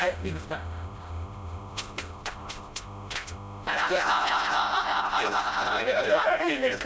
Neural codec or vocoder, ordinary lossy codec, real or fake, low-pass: codec, 16 kHz, 0.5 kbps, FreqCodec, smaller model; none; fake; none